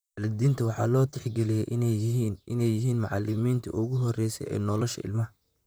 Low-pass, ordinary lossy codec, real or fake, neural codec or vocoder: none; none; fake; vocoder, 44.1 kHz, 128 mel bands, Pupu-Vocoder